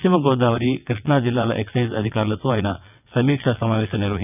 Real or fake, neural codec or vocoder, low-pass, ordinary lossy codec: fake; vocoder, 22.05 kHz, 80 mel bands, WaveNeXt; 3.6 kHz; none